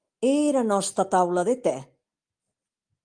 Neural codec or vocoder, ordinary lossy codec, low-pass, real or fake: none; Opus, 32 kbps; 9.9 kHz; real